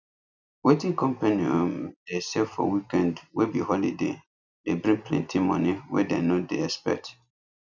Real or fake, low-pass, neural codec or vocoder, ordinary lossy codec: real; 7.2 kHz; none; none